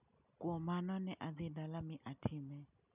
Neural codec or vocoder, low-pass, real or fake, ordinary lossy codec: none; 3.6 kHz; real; none